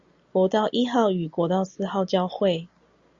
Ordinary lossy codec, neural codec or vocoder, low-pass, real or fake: Opus, 64 kbps; none; 7.2 kHz; real